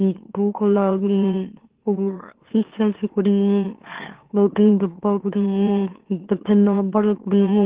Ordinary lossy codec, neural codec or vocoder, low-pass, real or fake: Opus, 16 kbps; autoencoder, 44.1 kHz, a latent of 192 numbers a frame, MeloTTS; 3.6 kHz; fake